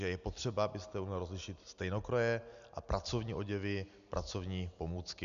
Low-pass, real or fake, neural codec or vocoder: 7.2 kHz; real; none